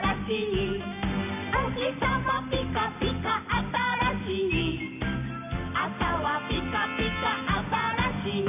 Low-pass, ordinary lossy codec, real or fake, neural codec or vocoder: 3.6 kHz; none; real; none